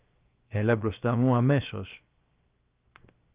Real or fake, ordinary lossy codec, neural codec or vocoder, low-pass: fake; Opus, 32 kbps; codec, 16 kHz, 0.7 kbps, FocalCodec; 3.6 kHz